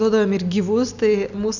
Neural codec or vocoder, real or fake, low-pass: none; real; 7.2 kHz